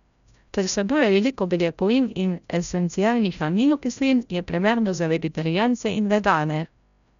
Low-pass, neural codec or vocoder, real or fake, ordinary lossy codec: 7.2 kHz; codec, 16 kHz, 0.5 kbps, FreqCodec, larger model; fake; none